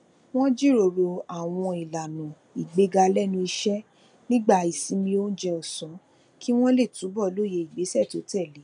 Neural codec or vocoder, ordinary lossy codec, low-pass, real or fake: none; none; 9.9 kHz; real